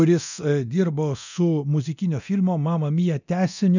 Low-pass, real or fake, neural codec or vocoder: 7.2 kHz; fake; codec, 24 kHz, 0.9 kbps, DualCodec